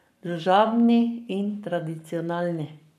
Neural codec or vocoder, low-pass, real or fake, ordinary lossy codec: codec, 44.1 kHz, 7.8 kbps, Pupu-Codec; 14.4 kHz; fake; none